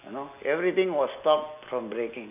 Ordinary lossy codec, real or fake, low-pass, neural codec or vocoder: none; real; 3.6 kHz; none